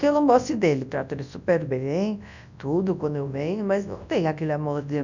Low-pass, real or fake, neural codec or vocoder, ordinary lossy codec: 7.2 kHz; fake; codec, 24 kHz, 0.9 kbps, WavTokenizer, large speech release; none